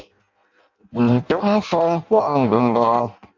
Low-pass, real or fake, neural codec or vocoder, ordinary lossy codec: 7.2 kHz; fake; codec, 16 kHz in and 24 kHz out, 0.6 kbps, FireRedTTS-2 codec; MP3, 64 kbps